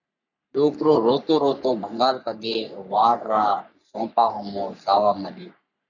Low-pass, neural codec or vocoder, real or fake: 7.2 kHz; codec, 44.1 kHz, 3.4 kbps, Pupu-Codec; fake